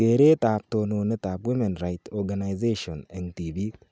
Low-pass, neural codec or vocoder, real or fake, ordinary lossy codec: none; none; real; none